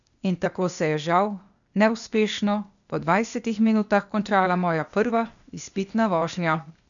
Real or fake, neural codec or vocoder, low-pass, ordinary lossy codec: fake; codec, 16 kHz, 0.8 kbps, ZipCodec; 7.2 kHz; none